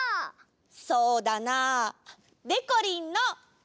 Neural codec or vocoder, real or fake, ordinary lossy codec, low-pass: none; real; none; none